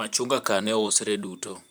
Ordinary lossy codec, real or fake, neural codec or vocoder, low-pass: none; fake; vocoder, 44.1 kHz, 128 mel bands, Pupu-Vocoder; none